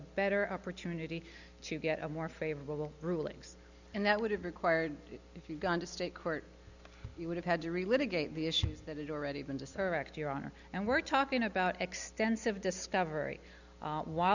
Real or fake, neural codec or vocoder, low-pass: real; none; 7.2 kHz